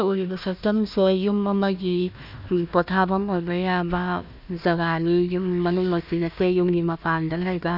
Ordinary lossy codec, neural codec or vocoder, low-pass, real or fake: none; codec, 16 kHz, 1 kbps, FunCodec, trained on Chinese and English, 50 frames a second; 5.4 kHz; fake